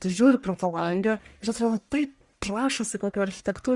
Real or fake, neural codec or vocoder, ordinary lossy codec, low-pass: fake; codec, 44.1 kHz, 1.7 kbps, Pupu-Codec; Opus, 64 kbps; 10.8 kHz